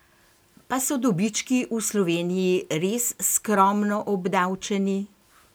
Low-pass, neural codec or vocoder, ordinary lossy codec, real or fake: none; none; none; real